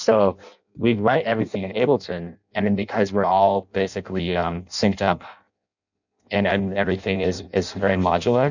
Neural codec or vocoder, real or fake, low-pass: codec, 16 kHz in and 24 kHz out, 0.6 kbps, FireRedTTS-2 codec; fake; 7.2 kHz